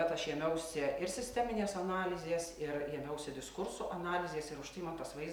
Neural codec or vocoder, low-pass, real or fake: none; 19.8 kHz; real